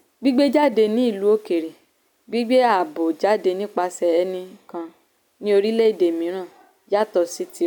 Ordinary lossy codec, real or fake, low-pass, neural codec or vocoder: none; real; none; none